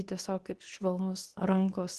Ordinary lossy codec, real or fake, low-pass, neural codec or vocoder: Opus, 16 kbps; fake; 10.8 kHz; codec, 24 kHz, 0.9 kbps, WavTokenizer, medium speech release version 2